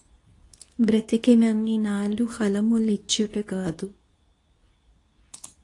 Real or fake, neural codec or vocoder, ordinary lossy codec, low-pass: fake; codec, 24 kHz, 0.9 kbps, WavTokenizer, medium speech release version 2; AAC, 48 kbps; 10.8 kHz